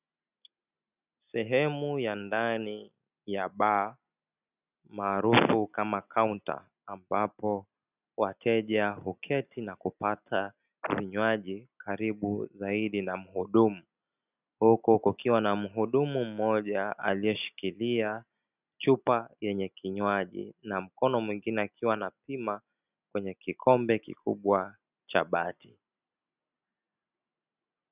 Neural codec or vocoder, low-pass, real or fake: none; 3.6 kHz; real